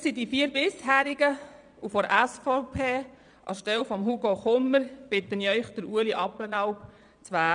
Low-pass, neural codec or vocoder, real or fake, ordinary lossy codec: 9.9 kHz; vocoder, 22.05 kHz, 80 mel bands, Vocos; fake; none